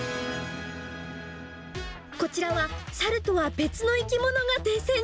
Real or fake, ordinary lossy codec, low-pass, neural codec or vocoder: real; none; none; none